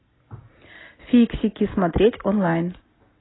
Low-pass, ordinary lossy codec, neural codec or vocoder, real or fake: 7.2 kHz; AAC, 16 kbps; none; real